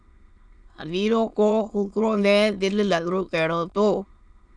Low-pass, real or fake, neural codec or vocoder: 9.9 kHz; fake; autoencoder, 22.05 kHz, a latent of 192 numbers a frame, VITS, trained on many speakers